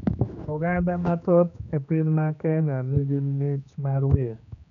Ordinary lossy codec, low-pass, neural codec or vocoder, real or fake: none; 7.2 kHz; codec, 16 kHz, 2 kbps, X-Codec, HuBERT features, trained on general audio; fake